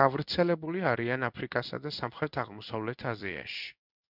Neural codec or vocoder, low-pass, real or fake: codec, 16 kHz in and 24 kHz out, 1 kbps, XY-Tokenizer; 5.4 kHz; fake